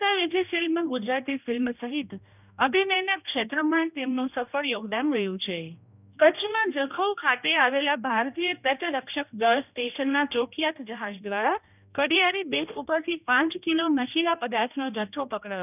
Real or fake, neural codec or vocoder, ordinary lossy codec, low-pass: fake; codec, 16 kHz, 1 kbps, X-Codec, HuBERT features, trained on general audio; none; 3.6 kHz